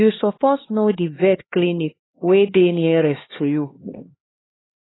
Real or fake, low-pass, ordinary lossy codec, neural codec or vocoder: fake; 7.2 kHz; AAC, 16 kbps; codec, 16 kHz, 2 kbps, X-Codec, HuBERT features, trained on LibriSpeech